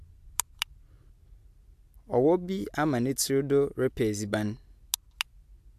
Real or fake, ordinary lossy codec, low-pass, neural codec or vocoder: real; AAC, 96 kbps; 14.4 kHz; none